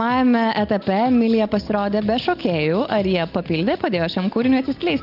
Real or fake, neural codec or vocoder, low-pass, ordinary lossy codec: real; none; 5.4 kHz; Opus, 32 kbps